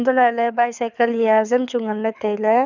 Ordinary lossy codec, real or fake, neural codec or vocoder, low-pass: none; fake; codec, 24 kHz, 6 kbps, HILCodec; 7.2 kHz